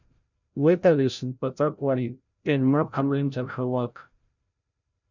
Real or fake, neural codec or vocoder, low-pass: fake; codec, 16 kHz, 0.5 kbps, FreqCodec, larger model; 7.2 kHz